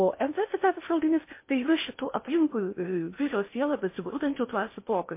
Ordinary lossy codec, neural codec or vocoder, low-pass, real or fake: MP3, 24 kbps; codec, 16 kHz in and 24 kHz out, 0.6 kbps, FocalCodec, streaming, 4096 codes; 3.6 kHz; fake